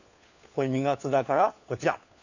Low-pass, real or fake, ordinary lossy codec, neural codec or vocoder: 7.2 kHz; fake; none; codec, 16 kHz, 4 kbps, FunCodec, trained on LibriTTS, 50 frames a second